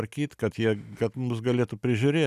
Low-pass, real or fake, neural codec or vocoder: 14.4 kHz; real; none